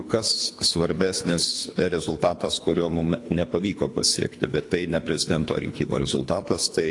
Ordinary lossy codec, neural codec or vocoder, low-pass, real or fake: AAC, 48 kbps; codec, 24 kHz, 3 kbps, HILCodec; 10.8 kHz; fake